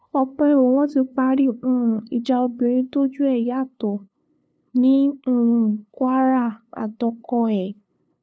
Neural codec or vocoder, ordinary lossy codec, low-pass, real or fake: codec, 16 kHz, 2 kbps, FunCodec, trained on LibriTTS, 25 frames a second; none; none; fake